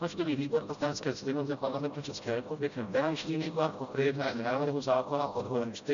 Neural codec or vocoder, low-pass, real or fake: codec, 16 kHz, 0.5 kbps, FreqCodec, smaller model; 7.2 kHz; fake